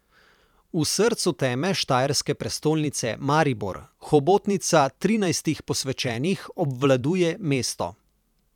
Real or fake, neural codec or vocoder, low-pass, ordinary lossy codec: fake; vocoder, 44.1 kHz, 128 mel bands every 512 samples, BigVGAN v2; 19.8 kHz; none